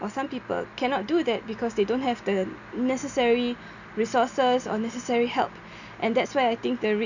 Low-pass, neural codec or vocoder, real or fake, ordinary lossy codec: 7.2 kHz; none; real; none